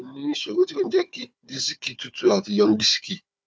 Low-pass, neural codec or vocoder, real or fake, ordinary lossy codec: none; codec, 16 kHz, 4 kbps, FunCodec, trained on Chinese and English, 50 frames a second; fake; none